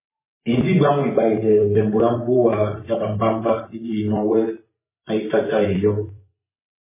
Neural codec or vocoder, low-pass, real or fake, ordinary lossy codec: vocoder, 24 kHz, 100 mel bands, Vocos; 3.6 kHz; fake; MP3, 16 kbps